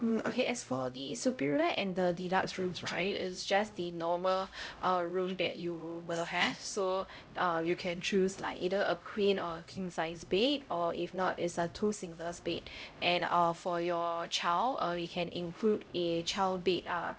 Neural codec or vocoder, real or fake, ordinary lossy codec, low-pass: codec, 16 kHz, 0.5 kbps, X-Codec, HuBERT features, trained on LibriSpeech; fake; none; none